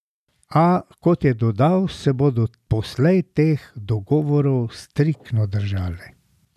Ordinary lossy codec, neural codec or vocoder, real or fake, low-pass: none; none; real; 14.4 kHz